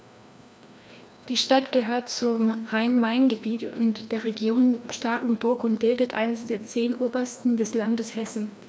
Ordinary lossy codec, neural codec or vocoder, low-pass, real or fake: none; codec, 16 kHz, 1 kbps, FreqCodec, larger model; none; fake